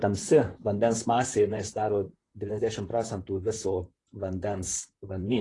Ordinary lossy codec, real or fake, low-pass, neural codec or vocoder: AAC, 32 kbps; fake; 10.8 kHz; vocoder, 44.1 kHz, 128 mel bands, Pupu-Vocoder